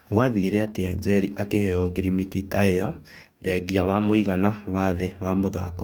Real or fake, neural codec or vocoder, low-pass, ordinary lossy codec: fake; codec, 44.1 kHz, 2.6 kbps, DAC; 19.8 kHz; none